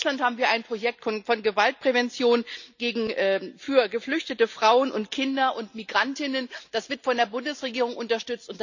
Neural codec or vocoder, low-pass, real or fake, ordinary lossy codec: none; 7.2 kHz; real; none